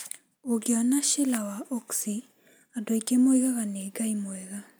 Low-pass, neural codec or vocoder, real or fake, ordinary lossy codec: none; none; real; none